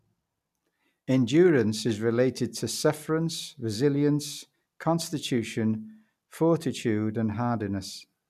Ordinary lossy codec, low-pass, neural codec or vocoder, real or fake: none; 14.4 kHz; none; real